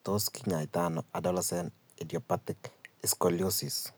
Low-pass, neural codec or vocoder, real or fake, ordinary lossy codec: none; none; real; none